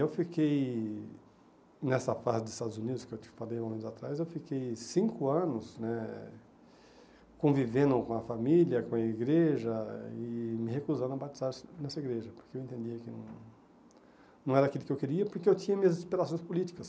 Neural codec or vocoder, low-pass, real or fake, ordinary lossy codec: none; none; real; none